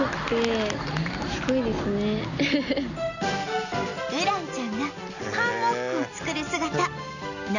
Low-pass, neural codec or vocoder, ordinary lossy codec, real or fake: 7.2 kHz; none; none; real